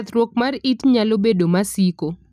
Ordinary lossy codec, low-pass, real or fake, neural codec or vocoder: none; 14.4 kHz; real; none